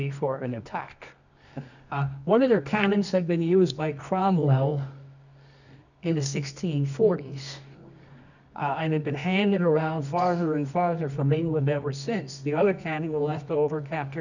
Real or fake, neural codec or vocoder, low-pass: fake; codec, 24 kHz, 0.9 kbps, WavTokenizer, medium music audio release; 7.2 kHz